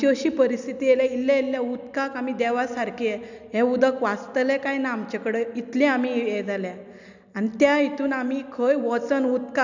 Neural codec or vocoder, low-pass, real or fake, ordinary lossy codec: none; 7.2 kHz; real; none